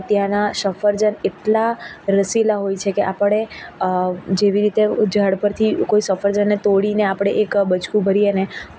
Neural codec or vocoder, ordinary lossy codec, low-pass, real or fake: none; none; none; real